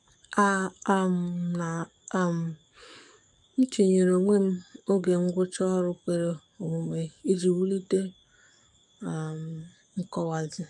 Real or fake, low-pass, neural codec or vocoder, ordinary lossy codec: fake; 10.8 kHz; codec, 44.1 kHz, 7.8 kbps, DAC; none